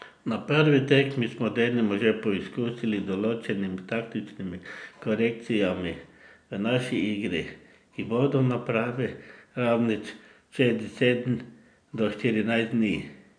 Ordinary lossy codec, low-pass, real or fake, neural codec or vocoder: none; 9.9 kHz; real; none